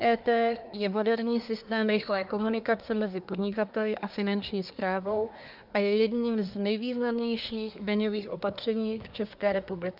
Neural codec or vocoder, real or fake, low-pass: codec, 24 kHz, 1 kbps, SNAC; fake; 5.4 kHz